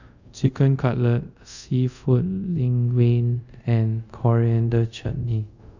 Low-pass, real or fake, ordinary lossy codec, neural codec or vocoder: 7.2 kHz; fake; none; codec, 24 kHz, 0.5 kbps, DualCodec